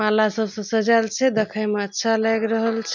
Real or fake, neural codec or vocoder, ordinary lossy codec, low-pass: real; none; none; 7.2 kHz